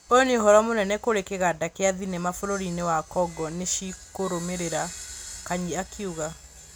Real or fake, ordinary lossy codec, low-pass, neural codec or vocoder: real; none; none; none